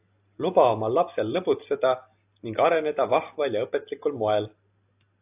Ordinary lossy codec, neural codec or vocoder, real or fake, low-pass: AAC, 32 kbps; none; real; 3.6 kHz